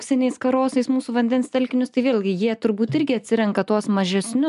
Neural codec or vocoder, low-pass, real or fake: none; 10.8 kHz; real